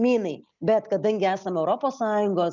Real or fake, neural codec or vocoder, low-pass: real; none; 7.2 kHz